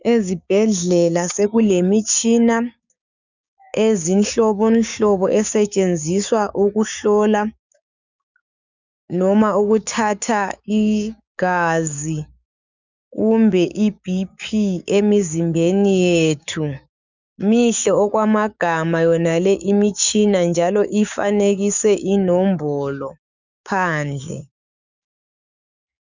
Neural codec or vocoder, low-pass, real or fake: codec, 16 kHz, 6 kbps, DAC; 7.2 kHz; fake